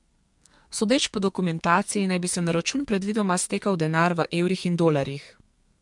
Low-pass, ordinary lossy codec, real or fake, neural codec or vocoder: 10.8 kHz; MP3, 64 kbps; fake; codec, 44.1 kHz, 2.6 kbps, SNAC